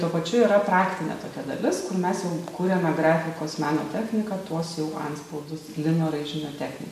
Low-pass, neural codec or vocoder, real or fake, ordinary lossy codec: 14.4 kHz; none; real; MP3, 64 kbps